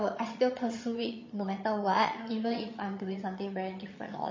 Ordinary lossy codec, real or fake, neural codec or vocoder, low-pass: MP3, 32 kbps; fake; vocoder, 22.05 kHz, 80 mel bands, HiFi-GAN; 7.2 kHz